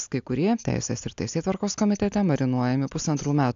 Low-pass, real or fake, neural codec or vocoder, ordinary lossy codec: 7.2 kHz; real; none; AAC, 64 kbps